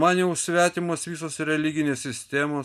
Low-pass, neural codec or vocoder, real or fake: 14.4 kHz; none; real